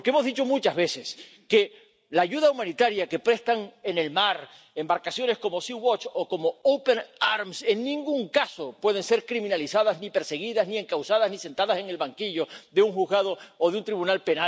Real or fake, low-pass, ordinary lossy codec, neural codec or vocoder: real; none; none; none